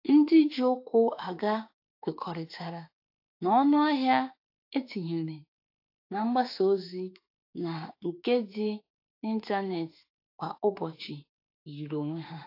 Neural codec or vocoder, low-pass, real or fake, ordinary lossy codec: autoencoder, 48 kHz, 32 numbers a frame, DAC-VAE, trained on Japanese speech; 5.4 kHz; fake; AAC, 32 kbps